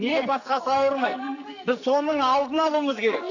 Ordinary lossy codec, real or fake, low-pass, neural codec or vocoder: none; fake; 7.2 kHz; codec, 44.1 kHz, 2.6 kbps, SNAC